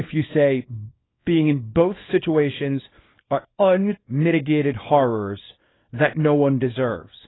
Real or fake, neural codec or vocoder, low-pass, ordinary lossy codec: fake; codec, 24 kHz, 0.9 kbps, WavTokenizer, small release; 7.2 kHz; AAC, 16 kbps